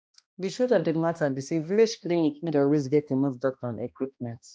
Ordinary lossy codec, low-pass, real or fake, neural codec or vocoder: none; none; fake; codec, 16 kHz, 1 kbps, X-Codec, HuBERT features, trained on balanced general audio